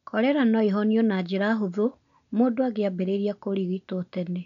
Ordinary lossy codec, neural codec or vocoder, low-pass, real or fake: none; none; 7.2 kHz; real